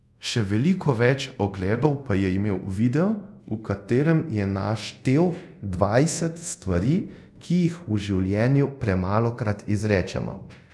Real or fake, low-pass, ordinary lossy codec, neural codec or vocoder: fake; none; none; codec, 24 kHz, 0.5 kbps, DualCodec